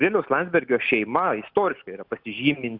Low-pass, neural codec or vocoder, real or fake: 5.4 kHz; none; real